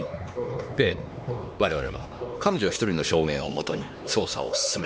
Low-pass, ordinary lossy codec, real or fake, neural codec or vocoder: none; none; fake; codec, 16 kHz, 2 kbps, X-Codec, HuBERT features, trained on LibriSpeech